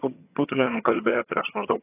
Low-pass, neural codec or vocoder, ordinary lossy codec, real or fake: 3.6 kHz; vocoder, 22.05 kHz, 80 mel bands, HiFi-GAN; AAC, 16 kbps; fake